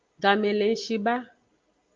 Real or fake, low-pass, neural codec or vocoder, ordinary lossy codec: real; 7.2 kHz; none; Opus, 32 kbps